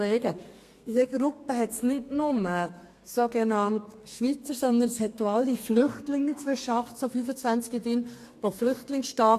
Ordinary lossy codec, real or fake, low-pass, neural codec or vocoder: AAC, 64 kbps; fake; 14.4 kHz; codec, 32 kHz, 1.9 kbps, SNAC